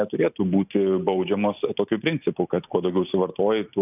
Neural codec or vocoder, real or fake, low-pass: none; real; 3.6 kHz